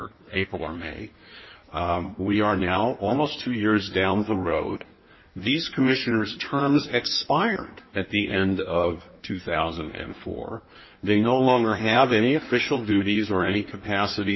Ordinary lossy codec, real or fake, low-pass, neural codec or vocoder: MP3, 24 kbps; fake; 7.2 kHz; codec, 16 kHz in and 24 kHz out, 1.1 kbps, FireRedTTS-2 codec